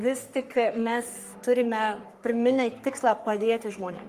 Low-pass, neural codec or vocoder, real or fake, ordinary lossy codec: 14.4 kHz; codec, 44.1 kHz, 3.4 kbps, Pupu-Codec; fake; Opus, 32 kbps